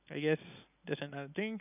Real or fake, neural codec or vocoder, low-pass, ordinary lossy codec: real; none; 3.6 kHz; none